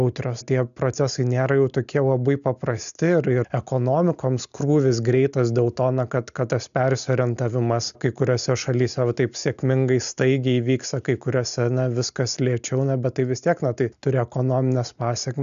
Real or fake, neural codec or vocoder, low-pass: real; none; 7.2 kHz